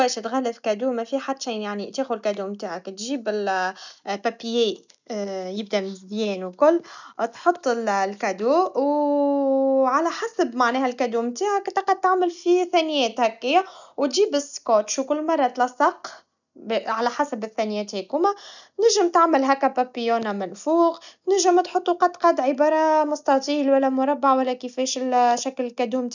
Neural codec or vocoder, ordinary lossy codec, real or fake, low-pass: none; none; real; 7.2 kHz